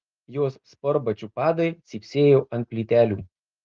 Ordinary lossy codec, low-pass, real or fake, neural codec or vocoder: Opus, 32 kbps; 7.2 kHz; real; none